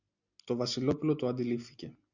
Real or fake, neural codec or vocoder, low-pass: real; none; 7.2 kHz